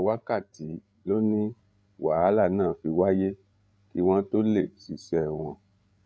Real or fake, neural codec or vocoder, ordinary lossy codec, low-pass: fake; codec, 16 kHz, 16 kbps, FreqCodec, larger model; none; none